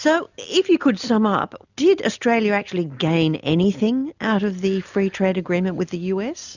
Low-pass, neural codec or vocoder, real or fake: 7.2 kHz; none; real